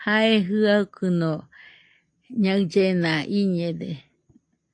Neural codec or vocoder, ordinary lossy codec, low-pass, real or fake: none; AAC, 48 kbps; 9.9 kHz; real